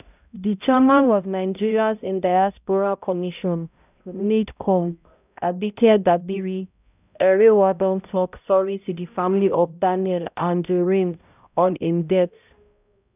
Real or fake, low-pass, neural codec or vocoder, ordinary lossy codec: fake; 3.6 kHz; codec, 16 kHz, 0.5 kbps, X-Codec, HuBERT features, trained on balanced general audio; none